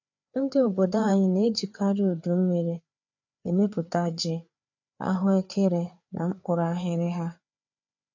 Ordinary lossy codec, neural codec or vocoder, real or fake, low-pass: none; codec, 16 kHz, 4 kbps, FreqCodec, larger model; fake; 7.2 kHz